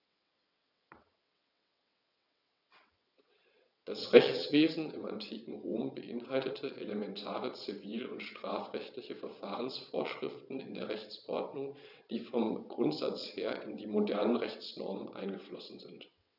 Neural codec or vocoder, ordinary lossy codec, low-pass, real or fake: vocoder, 22.05 kHz, 80 mel bands, WaveNeXt; none; 5.4 kHz; fake